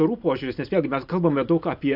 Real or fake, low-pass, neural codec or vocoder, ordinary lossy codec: real; 5.4 kHz; none; AAC, 48 kbps